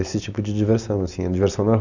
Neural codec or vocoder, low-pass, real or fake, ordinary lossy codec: none; 7.2 kHz; real; none